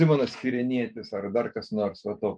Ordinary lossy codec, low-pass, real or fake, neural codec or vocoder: MP3, 64 kbps; 9.9 kHz; real; none